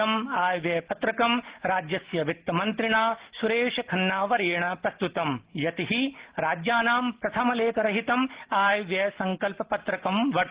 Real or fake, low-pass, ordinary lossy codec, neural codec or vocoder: real; 3.6 kHz; Opus, 16 kbps; none